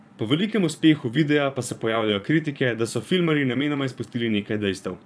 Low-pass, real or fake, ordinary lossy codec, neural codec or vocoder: none; fake; none; vocoder, 22.05 kHz, 80 mel bands, Vocos